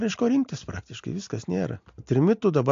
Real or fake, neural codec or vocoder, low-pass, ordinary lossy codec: real; none; 7.2 kHz; AAC, 48 kbps